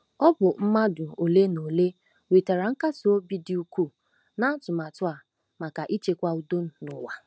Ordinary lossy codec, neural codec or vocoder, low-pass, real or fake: none; none; none; real